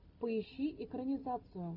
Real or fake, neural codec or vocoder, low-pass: real; none; 5.4 kHz